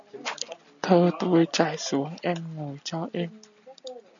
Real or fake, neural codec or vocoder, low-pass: real; none; 7.2 kHz